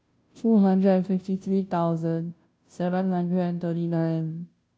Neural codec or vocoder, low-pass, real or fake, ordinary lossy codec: codec, 16 kHz, 0.5 kbps, FunCodec, trained on Chinese and English, 25 frames a second; none; fake; none